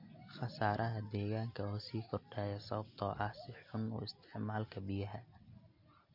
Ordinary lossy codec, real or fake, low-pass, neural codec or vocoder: MP3, 32 kbps; real; 5.4 kHz; none